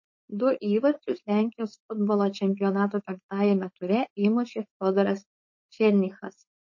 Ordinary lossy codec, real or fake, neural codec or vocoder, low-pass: MP3, 32 kbps; fake; codec, 16 kHz, 4.8 kbps, FACodec; 7.2 kHz